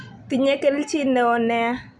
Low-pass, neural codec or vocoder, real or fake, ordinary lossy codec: none; none; real; none